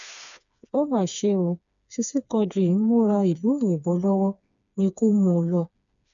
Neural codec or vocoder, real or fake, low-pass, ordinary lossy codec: codec, 16 kHz, 4 kbps, FreqCodec, smaller model; fake; 7.2 kHz; none